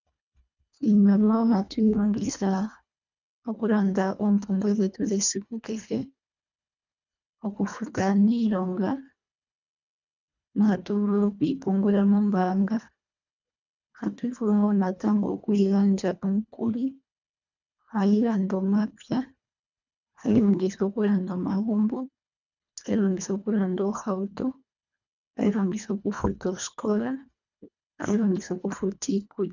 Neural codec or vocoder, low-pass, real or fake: codec, 24 kHz, 1.5 kbps, HILCodec; 7.2 kHz; fake